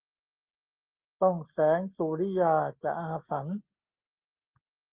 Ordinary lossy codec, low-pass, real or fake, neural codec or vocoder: Opus, 32 kbps; 3.6 kHz; real; none